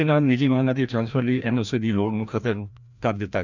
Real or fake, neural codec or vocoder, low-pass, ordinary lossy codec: fake; codec, 16 kHz, 1 kbps, FreqCodec, larger model; 7.2 kHz; none